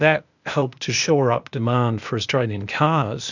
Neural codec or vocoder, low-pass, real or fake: codec, 16 kHz, 0.8 kbps, ZipCodec; 7.2 kHz; fake